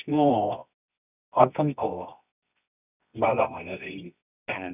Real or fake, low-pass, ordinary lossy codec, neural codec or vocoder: fake; 3.6 kHz; none; codec, 24 kHz, 0.9 kbps, WavTokenizer, medium music audio release